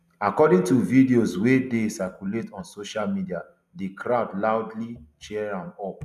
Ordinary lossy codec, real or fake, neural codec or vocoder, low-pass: none; real; none; 14.4 kHz